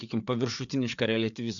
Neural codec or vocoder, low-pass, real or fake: codec, 16 kHz, 4 kbps, FreqCodec, larger model; 7.2 kHz; fake